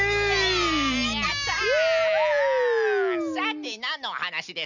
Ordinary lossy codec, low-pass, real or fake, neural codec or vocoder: none; 7.2 kHz; real; none